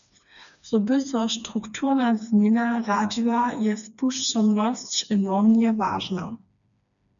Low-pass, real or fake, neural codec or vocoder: 7.2 kHz; fake; codec, 16 kHz, 2 kbps, FreqCodec, smaller model